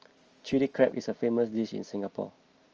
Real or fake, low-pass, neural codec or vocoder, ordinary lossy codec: real; 7.2 kHz; none; Opus, 24 kbps